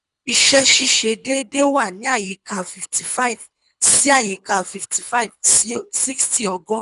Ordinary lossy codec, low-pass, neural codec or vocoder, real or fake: none; 10.8 kHz; codec, 24 kHz, 3 kbps, HILCodec; fake